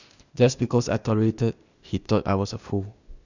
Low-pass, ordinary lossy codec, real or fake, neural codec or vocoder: 7.2 kHz; none; fake; codec, 16 kHz, 0.8 kbps, ZipCodec